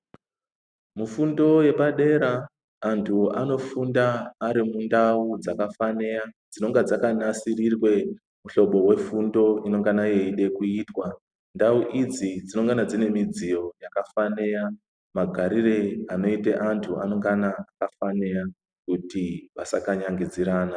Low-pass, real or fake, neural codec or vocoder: 9.9 kHz; real; none